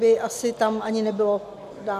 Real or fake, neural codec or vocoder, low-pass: real; none; 14.4 kHz